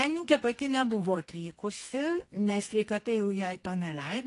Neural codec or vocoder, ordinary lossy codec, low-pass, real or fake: codec, 24 kHz, 0.9 kbps, WavTokenizer, medium music audio release; AAC, 48 kbps; 10.8 kHz; fake